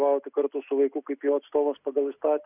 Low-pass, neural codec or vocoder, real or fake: 3.6 kHz; none; real